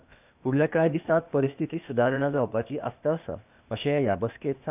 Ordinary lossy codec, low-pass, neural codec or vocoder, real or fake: none; 3.6 kHz; codec, 16 kHz, 0.8 kbps, ZipCodec; fake